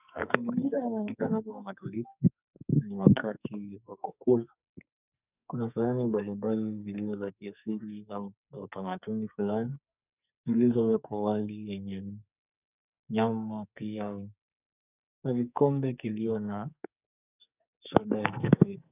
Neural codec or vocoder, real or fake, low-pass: codec, 44.1 kHz, 2.6 kbps, SNAC; fake; 3.6 kHz